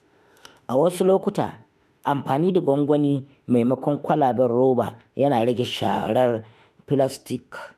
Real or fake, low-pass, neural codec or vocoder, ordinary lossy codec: fake; 14.4 kHz; autoencoder, 48 kHz, 32 numbers a frame, DAC-VAE, trained on Japanese speech; none